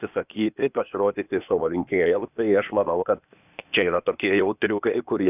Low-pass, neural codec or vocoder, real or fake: 3.6 kHz; codec, 16 kHz, 0.8 kbps, ZipCodec; fake